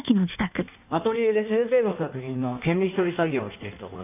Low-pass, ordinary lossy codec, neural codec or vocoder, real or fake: 3.6 kHz; none; codec, 16 kHz in and 24 kHz out, 1.1 kbps, FireRedTTS-2 codec; fake